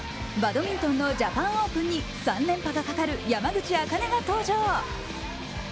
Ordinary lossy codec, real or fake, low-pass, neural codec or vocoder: none; real; none; none